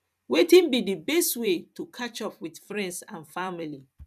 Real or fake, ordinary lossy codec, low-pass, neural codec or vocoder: fake; none; 14.4 kHz; vocoder, 44.1 kHz, 128 mel bands every 256 samples, BigVGAN v2